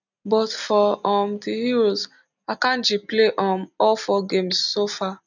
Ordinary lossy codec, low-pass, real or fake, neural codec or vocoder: none; 7.2 kHz; real; none